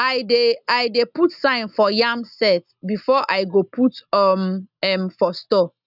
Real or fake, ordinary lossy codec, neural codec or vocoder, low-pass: real; none; none; 5.4 kHz